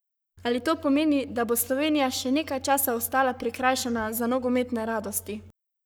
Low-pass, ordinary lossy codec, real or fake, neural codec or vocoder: none; none; fake; codec, 44.1 kHz, 7.8 kbps, Pupu-Codec